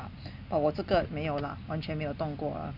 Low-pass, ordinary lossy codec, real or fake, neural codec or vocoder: 5.4 kHz; none; real; none